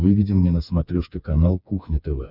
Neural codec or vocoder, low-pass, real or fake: codec, 16 kHz, 4 kbps, FreqCodec, smaller model; 5.4 kHz; fake